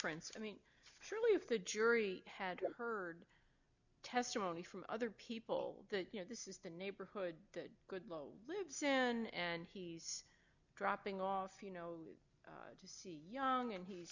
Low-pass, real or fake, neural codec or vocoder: 7.2 kHz; real; none